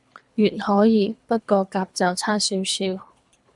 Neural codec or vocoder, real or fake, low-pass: codec, 24 kHz, 3 kbps, HILCodec; fake; 10.8 kHz